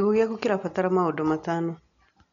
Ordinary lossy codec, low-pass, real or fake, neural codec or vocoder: none; 7.2 kHz; real; none